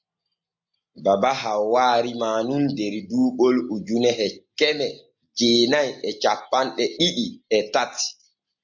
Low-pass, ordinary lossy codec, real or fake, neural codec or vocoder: 7.2 kHz; MP3, 64 kbps; real; none